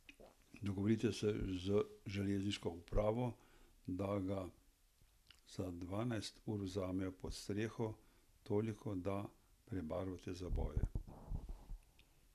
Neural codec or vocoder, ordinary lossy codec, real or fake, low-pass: none; none; real; 14.4 kHz